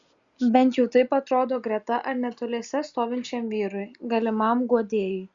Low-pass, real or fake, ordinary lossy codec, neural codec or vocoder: 7.2 kHz; real; Opus, 64 kbps; none